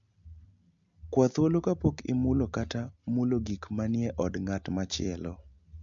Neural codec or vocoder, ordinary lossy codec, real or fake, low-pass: none; MP3, 64 kbps; real; 7.2 kHz